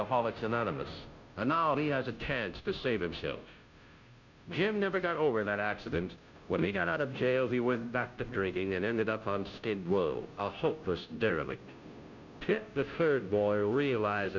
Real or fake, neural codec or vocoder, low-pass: fake; codec, 16 kHz, 0.5 kbps, FunCodec, trained on Chinese and English, 25 frames a second; 7.2 kHz